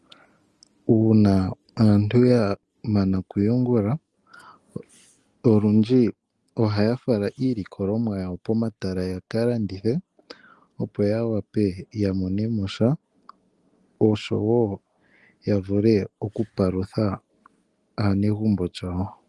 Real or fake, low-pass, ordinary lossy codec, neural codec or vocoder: real; 10.8 kHz; Opus, 24 kbps; none